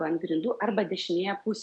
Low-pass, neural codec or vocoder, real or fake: 10.8 kHz; none; real